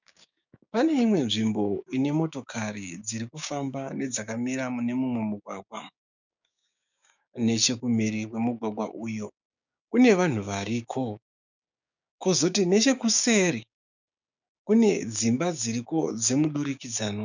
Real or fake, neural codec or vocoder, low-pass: fake; codec, 16 kHz, 6 kbps, DAC; 7.2 kHz